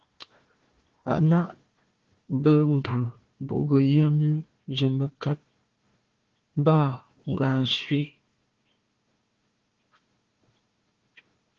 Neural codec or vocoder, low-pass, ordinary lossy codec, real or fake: codec, 16 kHz, 1 kbps, FunCodec, trained on Chinese and English, 50 frames a second; 7.2 kHz; Opus, 16 kbps; fake